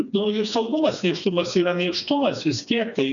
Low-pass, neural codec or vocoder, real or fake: 7.2 kHz; codec, 16 kHz, 2 kbps, FreqCodec, smaller model; fake